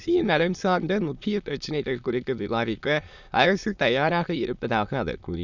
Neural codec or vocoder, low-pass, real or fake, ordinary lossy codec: autoencoder, 22.05 kHz, a latent of 192 numbers a frame, VITS, trained on many speakers; 7.2 kHz; fake; none